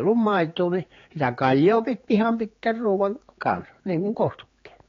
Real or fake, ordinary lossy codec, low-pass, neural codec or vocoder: fake; AAC, 32 kbps; 7.2 kHz; codec, 16 kHz, 4 kbps, X-Codec, HuBERT features, trained on general audio